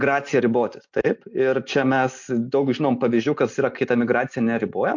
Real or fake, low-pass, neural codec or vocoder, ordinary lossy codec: real; 7.2 kHz; none; MP3, 48 kbps